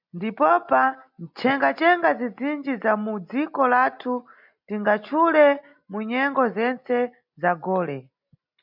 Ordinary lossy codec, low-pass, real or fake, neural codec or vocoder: MP3, 48 kbps; 5.4 kHz; real; none